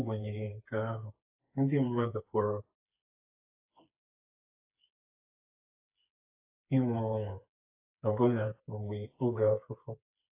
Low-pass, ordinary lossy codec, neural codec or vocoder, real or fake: 3.6 kHz; AAC, 32 kbps; codec, 16 kHz, 4 kbps, FreqCodec, smaller model; fake